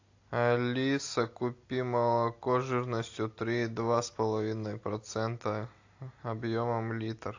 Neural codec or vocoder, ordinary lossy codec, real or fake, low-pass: none; AAC, 48 kbps; real; 7.2 kHz